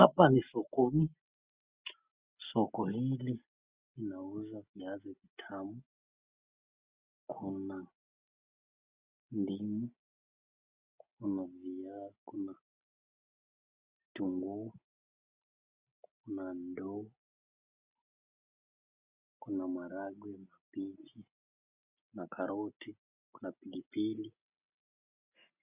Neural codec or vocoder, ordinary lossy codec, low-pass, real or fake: none; Opus, 64 kbps; 3.6 kHz; real